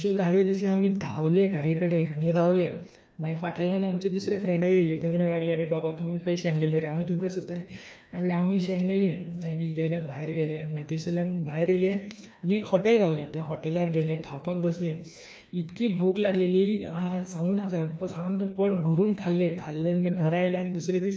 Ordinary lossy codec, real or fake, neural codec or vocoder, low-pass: none; fake; codec, 16 kHz, 1 kbps, FreqCodec, larger model; none